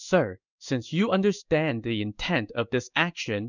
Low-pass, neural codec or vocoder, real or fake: 7.2 kHz; codec, 16 kHz in and 24 kHz out, 1 kbps, XY-Tokenizer; fake